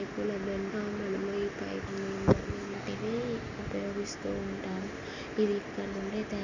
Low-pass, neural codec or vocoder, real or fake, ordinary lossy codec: 7.2 kHz; none; real; Opus, 64 kbps